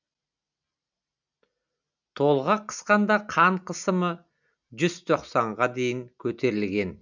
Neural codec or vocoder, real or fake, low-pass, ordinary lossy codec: none; real; 7.2 kHz; none